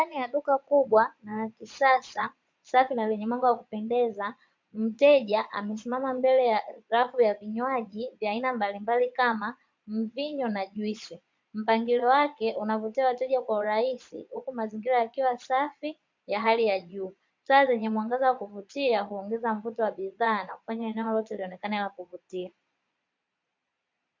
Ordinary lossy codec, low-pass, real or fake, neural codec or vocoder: MP3, 64 kbps; 7.2 kHz; fake; vocoder, 22.05 kHz, 80 mel bands, Vocos